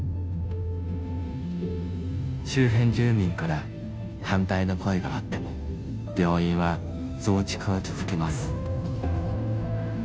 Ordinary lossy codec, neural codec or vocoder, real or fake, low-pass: none; codec, 16 kHz, 0.5 kbps, FunCodec, trained on Chinese and English, 25 frames a second; fake; none